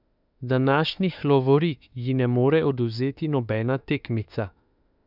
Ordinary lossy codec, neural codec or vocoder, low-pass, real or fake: none; autoencoder, 48 kHz, 32 numbers a frame, DAC-VAE, trained on Japanese speech; 5.4 kHz; fake